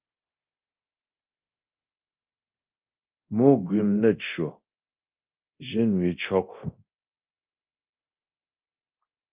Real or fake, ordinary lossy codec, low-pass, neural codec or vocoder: fake; Opus, 24 kbps; 3.6 kHz; codec, 24 kHz, 0.9 kbps, DualCodec